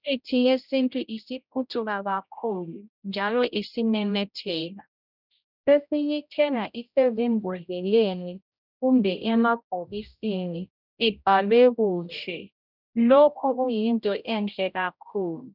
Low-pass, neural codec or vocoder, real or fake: 5.4 kHz; codec, 16 kHz, 0.5 kbps, X-Codec, HuBERT features, trained on general audio; fake